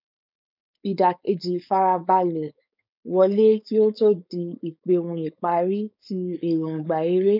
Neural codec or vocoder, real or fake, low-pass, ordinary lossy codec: codec, 16 kHz, 4.8 kbps, FACodec; fake; 5.4 kHz; none